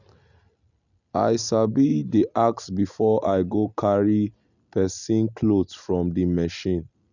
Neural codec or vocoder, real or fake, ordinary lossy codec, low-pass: none; real; none; 7.2 kHz